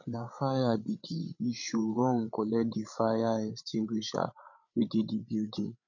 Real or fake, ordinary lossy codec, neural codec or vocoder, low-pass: fake; none; codec, 16 kHz, 8 kbps, FreqCodec, larger model; 7.2 kHz